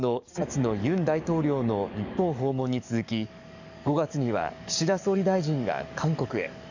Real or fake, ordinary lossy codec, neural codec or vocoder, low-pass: fake; none; codec, 44.1 kHz, 7.8 kbps, DAC; 7.2 kHz